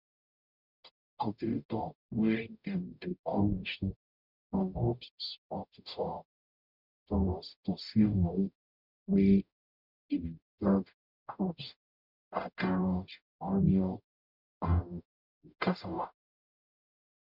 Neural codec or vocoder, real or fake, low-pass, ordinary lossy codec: codec, 44.1 kHz, 0.9 kbps, DAC; fake; 5.4 kHz; none